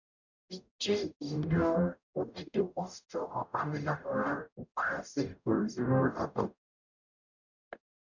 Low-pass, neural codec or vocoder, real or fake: 7.2 kHz; codec, 44.1 kHz, 0.9 kbps, DAC; fake